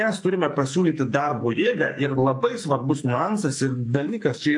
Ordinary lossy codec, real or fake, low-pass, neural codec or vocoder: AAC, 64 kbps; fake; 10.8 kHz; codec, 44.1 kHz, 2.6 kbps, SNAC